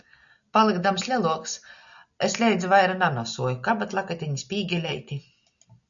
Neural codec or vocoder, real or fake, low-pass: none; real; 7.2 kHz